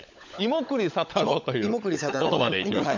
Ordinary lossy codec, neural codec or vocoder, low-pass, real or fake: MP3, 64 kbps; codec, 16 kHz, 16 kbps, FunCodec, trained on LibriTTS, 50 frames a second; 7.2 kHz; fake